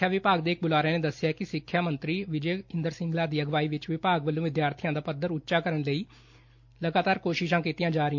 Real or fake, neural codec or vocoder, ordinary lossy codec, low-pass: real; none; MP3, 48 kbps; 7.2 kHz